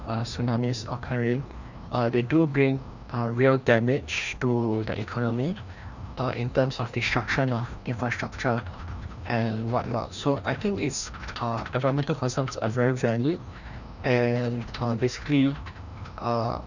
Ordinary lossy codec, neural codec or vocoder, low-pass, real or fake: none; codec, 16 kHz, 1 kbps, FreqCodec, larger model; 7.2 kHz; fake